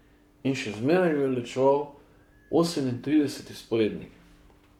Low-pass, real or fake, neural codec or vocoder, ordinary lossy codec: 19.8 kHz; fake; codec, 44.1 kHz, 7.8 kbps, Pupu-Codec; none